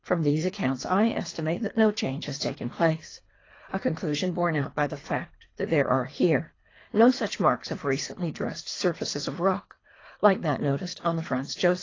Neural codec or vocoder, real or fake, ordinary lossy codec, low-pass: codec, 24 kHz, 3 kbps, HILCodec; fake; AAC, 32 kbps; 7.2 kHz